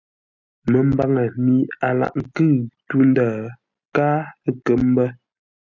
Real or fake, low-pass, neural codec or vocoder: real; 7.2 kHz; none